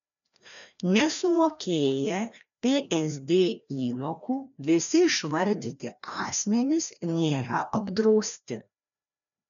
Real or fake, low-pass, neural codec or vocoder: fake; 7.2 kHz; codec, 16 kHz, 1 kbps, FreqCodec, larger model